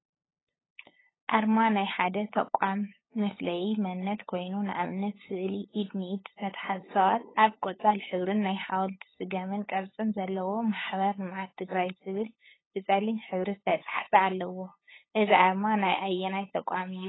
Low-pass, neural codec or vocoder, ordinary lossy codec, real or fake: 7.2 kHz; codec, 16 kHz, 8 kbps, FunCodec, trained on LibriTTS, 25 frames a second; AAC, 16 kbps; fake